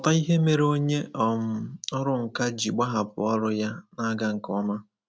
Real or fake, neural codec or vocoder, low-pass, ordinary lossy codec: real; none; none; none